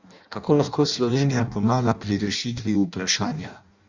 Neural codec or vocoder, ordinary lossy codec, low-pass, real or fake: codec, 16 kHz in and 24 kHz out, 0.6 kbps, FireRedTTS-2 codec; Opus, 64 kbps; 7.2 kHz; fake